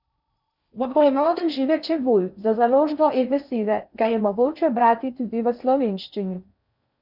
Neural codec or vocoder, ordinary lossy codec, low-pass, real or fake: codec, 16 kHz in and 24 kHz out, 0.6 kbps, FocalCodec, streaming, 4096 codes; none; 5.4 kHz; fake